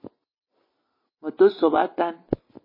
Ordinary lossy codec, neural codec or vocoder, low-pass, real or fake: MP3, 24 kbps; none; 5.4 kHz; real